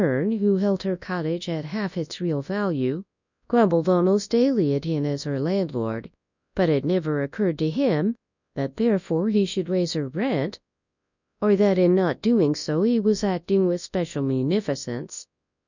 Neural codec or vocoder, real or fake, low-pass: codec, 24 kHz, 0.9 kbps, WavTokenizer, large speech release; fake; 7.2 kHz